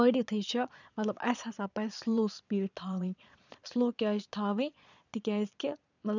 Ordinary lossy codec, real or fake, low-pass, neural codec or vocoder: none; fake; 7.2 kHz; vocoder, 22.05 kHz, 80 mel bands, Vocos